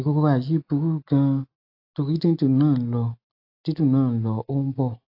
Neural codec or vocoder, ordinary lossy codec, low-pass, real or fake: none; AAC, 32 kbps; 5.4 kHz; real